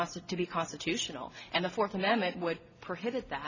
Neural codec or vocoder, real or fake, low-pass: none; real; 7.2 kHz